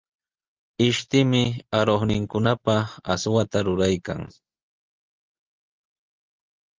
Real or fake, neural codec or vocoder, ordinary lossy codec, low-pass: real; none; Opus, 32 kbps; 7.2 kHz